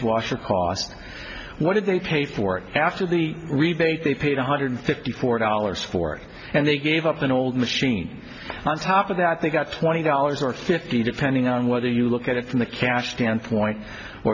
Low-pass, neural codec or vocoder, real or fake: 7.2 kHz; none; real